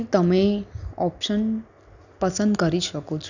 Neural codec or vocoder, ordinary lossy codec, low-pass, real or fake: none; none; 7.2 kHz; real